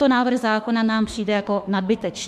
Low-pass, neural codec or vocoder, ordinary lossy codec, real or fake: 14.4 kHz; autoencoder, 48 kHz, 32 numbers a frame, DAC-VAE, trained on Japanese speech; MP3, 96 kbps; fake